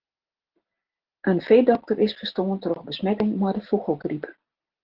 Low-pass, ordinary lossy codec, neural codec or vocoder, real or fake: 5.4 kHz; Opus, 16 kbps; none; real